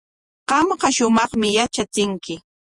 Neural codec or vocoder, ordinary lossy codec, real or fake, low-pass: vocoder, 48 kHz, 128 mel bands, Vocos; Opus, 64 kbps; fake; 10.8 kHz